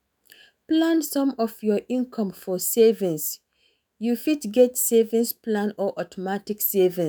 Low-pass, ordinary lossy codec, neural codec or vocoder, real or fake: none; none; autoencoder, 48 kHz, 128 numbers a frame, DAC-VAE, trained on Japanese speech; fake